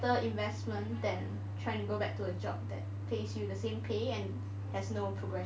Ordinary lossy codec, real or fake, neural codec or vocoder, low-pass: none; real; none; none